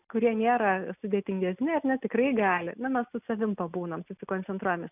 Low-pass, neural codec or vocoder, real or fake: 3.6 kHz; none; real